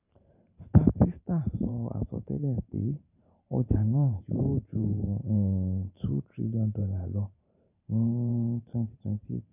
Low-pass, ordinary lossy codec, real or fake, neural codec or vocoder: 3.6 kHz; none; fake; vocoder, 44.1 kHz, 128 mel bands every 512 samples, BigVGAN v2